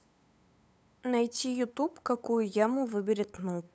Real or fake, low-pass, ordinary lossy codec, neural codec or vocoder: fake; none; none; codec, 16 kHz, 8 kbps, FunCodec, trained on LibriTTS, 25 frames a second